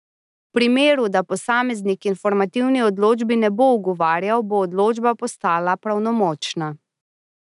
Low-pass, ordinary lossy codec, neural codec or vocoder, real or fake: 10.8 kHz; none; none; real